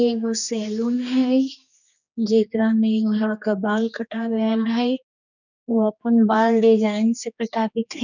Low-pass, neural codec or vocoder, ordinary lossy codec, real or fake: 7.2 kHz; codec, 16 kHz, 2 kbps, X-Codec, HuBERT features, trained on general audio; none; fake